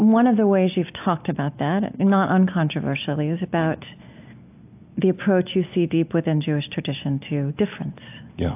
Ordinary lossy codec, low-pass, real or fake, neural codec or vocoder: AAC, 32 kbps; 3.6 kHz; real; none